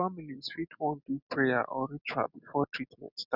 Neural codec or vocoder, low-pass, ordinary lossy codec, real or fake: none; 5.4 kHz; none; real